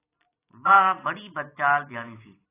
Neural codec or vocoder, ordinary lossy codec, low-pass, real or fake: none; AAC, 16 kbps; 3.6 kHz; real